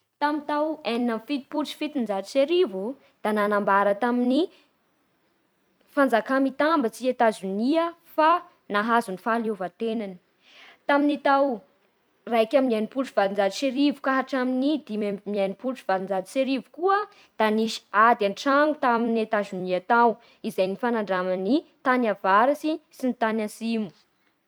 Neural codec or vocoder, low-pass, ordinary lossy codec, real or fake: vocoder, 44.1 kHz, 128 mel bands every 256 samples, BigVGAN v2; none; none; fake